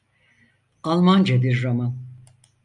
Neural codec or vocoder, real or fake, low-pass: none; real; 10.8 kHz